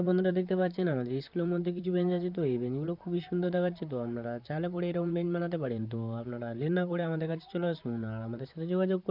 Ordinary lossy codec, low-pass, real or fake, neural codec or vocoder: none; 5.4 kHz; fake; vocoder, 44.1 kHz, 128 mel bands, Pupu-Vocoder